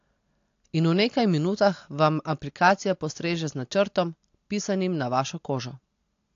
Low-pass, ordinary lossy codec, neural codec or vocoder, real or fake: 7.2 kHz; AAC, 48 kbps; none; real